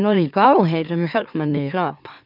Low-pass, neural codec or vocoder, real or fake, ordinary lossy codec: 5.4 kHz; autoencoder, 44.1 kHz, a latent of 192 numbers a frame, MeloTTS; fake; none